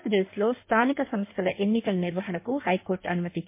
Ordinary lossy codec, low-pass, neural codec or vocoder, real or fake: MP3, 24 kbps; 3.6 kHz; codec, 16 kHz in and 24 kHz out, 1.1 kbps, FireRedTTS-2 codec; fake